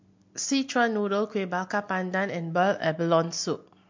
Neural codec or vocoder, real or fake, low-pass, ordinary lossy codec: none; real; 7.2 kHz; MP3, 48 kbps